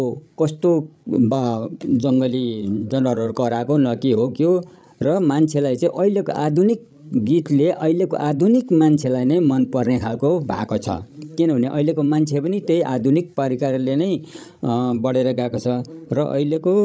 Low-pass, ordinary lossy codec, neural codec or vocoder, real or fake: none; none; codec, 16 kHz, 16 kbps, FreqCodec, larger model; fake